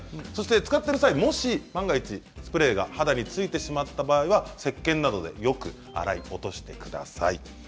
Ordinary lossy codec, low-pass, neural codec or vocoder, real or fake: none; none; none; real